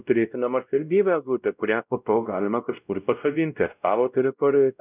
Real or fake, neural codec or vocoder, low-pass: fake; codec, 16 kHz, 0.5 kbps, X-Codec, WavLM features, trained on Multilingual LibriSpeech; 3.6 kHz